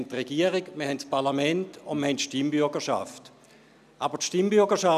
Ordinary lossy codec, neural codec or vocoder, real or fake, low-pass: none; none; real; 14.4 kHz